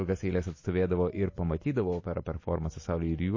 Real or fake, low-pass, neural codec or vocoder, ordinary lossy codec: real; 7.2 kHz; none; MP3, 32 kbps